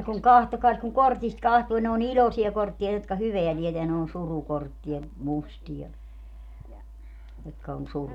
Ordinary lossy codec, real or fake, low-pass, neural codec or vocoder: none; real; 19.8 kHz; none